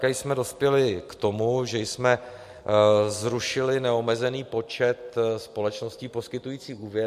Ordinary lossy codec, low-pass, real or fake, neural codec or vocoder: MP3, 64 kbps; 14.4 kHz; real; none